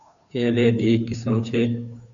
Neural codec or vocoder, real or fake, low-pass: codec, 16 kHz, 2 kbps, FunCodec, trained on Chinese and English, 25 frames a second; fake; 7.2 kHz